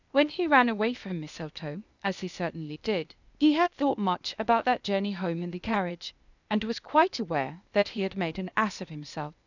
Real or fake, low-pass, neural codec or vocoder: fake; 7.2 kHz; codec, 16 kHz, 0.8 kbps, ZipCodec